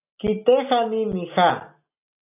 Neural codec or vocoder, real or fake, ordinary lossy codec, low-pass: none; real; AAC, 32 kbps; 3.6 kHz